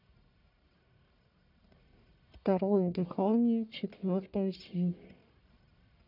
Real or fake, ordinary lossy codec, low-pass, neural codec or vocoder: fake; none; 5.4 kHz; codec, 44.1 kHz, 1.7 kbps, Pupu-Codec